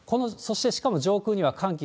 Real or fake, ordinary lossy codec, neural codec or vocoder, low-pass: real; none; none; none